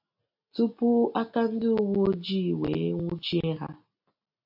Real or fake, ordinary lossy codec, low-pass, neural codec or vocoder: real; AAC, 48 kbps; 5.4 kHz; none